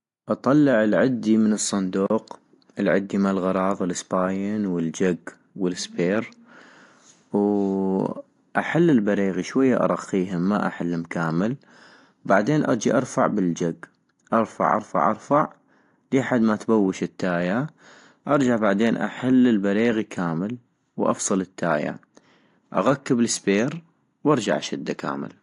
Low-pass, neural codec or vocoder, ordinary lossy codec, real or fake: 10.8 kHz; none; AAC, 48 kbps; real